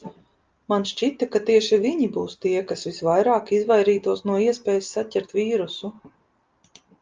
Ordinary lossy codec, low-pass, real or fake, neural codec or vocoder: Opus, 24 kbps; 7.2 kHz; real; none